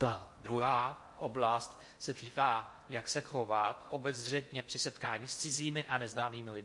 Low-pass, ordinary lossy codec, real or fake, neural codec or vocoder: 10.8 kHz; MP3, 48 kbps; fake; codec, 16 kHz in and 24 kHz out, 0.6 kbps, FocalCodec, streaming, 4096 codes